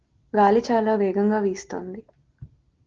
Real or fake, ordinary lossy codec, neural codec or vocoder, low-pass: real; Opus, 16 kbps; none; 7.2 kHz